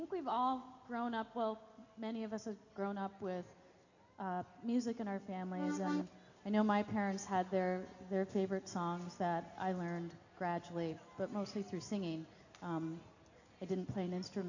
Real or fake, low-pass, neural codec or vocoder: real; 7.2 kHz; none